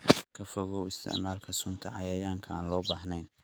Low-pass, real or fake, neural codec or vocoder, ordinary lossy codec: none; fake; codec, 44.1 kHz, 7.8 kbps, DAC; none